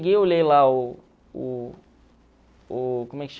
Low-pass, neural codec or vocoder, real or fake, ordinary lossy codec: none; none; real; none